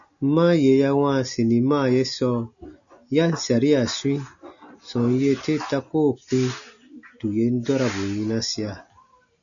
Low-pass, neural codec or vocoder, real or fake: 7.2 kHz; none; real